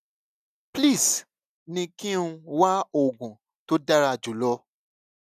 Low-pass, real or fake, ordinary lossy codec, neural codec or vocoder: 14.4 kHz; real; none; none